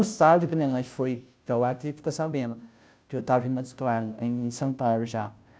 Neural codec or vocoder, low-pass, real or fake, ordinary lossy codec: codec, 16 kHz, 0.5 kbps, FunCodec, trained on Chinese and English, 25 frames a second; none; fake; none